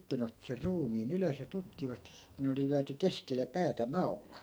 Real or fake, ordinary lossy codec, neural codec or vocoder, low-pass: fake; none; codec, 44.1 kHz, 2.6 kbps, SNAC; none